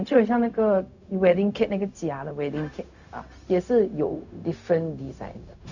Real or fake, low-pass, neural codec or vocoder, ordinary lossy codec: fake; 7.2 kHz; codec, 16 kHz, 0.4 kbps, LongCat-Audio-Codec; none